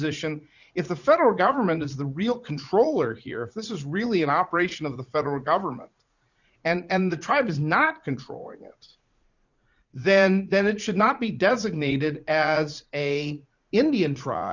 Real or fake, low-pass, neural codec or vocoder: fake; 7.2 kHz; vocoder, 44.1 kHz, 80 mel bands, Vocos